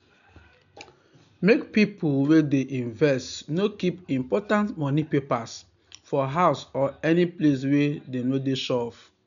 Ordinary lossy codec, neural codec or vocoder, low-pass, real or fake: none; none; 7.2 kHz; real